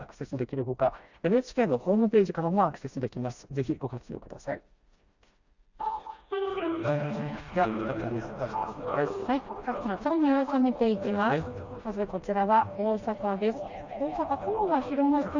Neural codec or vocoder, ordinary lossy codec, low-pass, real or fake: codec, 16 kHz, 1 kbps, FreqCodec, smaller model; Opus, 64 kbps; 7.2 kHz; fake